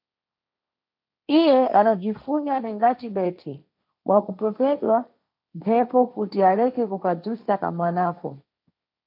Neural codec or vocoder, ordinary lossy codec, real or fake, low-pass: codec, 16 kHz, 1.1 kbps, Voila-Tokenizer; MP3, 48 kbps; fake; 5.4 kHz